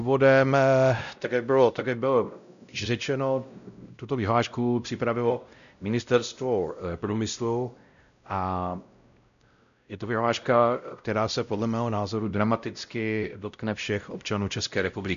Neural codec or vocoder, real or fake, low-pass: codec, 16 kHz, 0.5 kbps, X-Codec, WavLM features, trained on Multilingual LibriSpeech; fake; 7.2 kHz